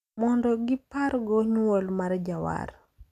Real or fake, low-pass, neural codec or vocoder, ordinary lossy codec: real; 14.4 kHz; none; none